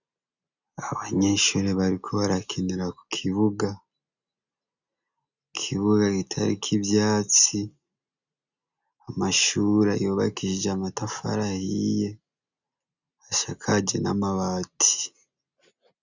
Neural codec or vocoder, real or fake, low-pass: none; real; 7.2 kHz